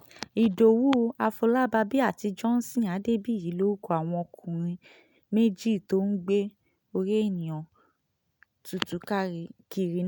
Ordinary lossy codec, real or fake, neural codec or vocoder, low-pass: none; real; none; none